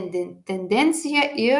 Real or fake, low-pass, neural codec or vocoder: real; 10.8 kHz; none